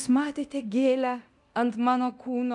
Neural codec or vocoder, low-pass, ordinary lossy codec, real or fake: codec, 24 kHz, 0.9 kbps, DualCodec; 10.8 kHz; MP3, 96 kbps; fake